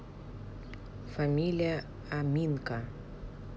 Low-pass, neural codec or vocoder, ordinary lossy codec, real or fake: none; none; none; real